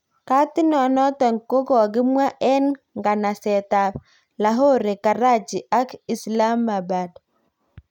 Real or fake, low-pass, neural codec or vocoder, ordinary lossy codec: real; 19.8 kHz; none; none